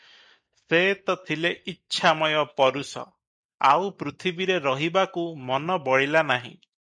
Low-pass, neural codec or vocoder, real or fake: 9.9 kHz; none; real